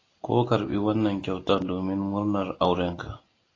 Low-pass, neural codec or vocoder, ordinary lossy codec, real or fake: 7.2 kHz; none; AAC, 32 kbps; real